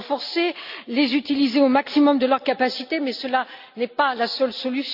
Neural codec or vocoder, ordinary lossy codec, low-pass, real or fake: none; none; 5.4 kHz; real